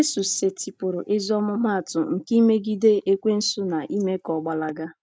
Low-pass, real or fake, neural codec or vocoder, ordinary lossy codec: none; real; none; none